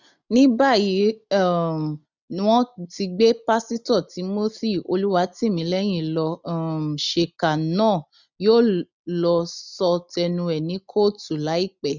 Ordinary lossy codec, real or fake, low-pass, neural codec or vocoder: none; real; 7.2 kHz; none